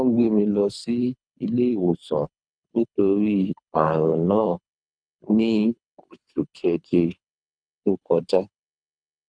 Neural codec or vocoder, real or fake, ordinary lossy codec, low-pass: codec, 24 kHz, 3 kbps, HILCodec; fake; none; 9.9 kHz